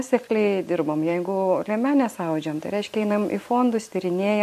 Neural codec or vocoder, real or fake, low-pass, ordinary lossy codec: vocoder, 44.1 kHz, 128 mel bands every 256 samples, BigVGAN v2; fake; 14.4 kHz; MP3, 64 kbps